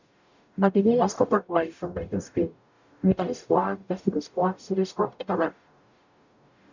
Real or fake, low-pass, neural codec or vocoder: fake; 7.2 kHz; codec, 44.1 kHz, 0.9 kbps, DAC